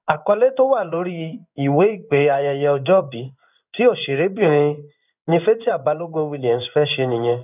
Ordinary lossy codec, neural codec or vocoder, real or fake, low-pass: none; codec, 16 kHz in and 24 kHz out, 1 kbps, XY-Tokenizer; fake; 3.6 kHz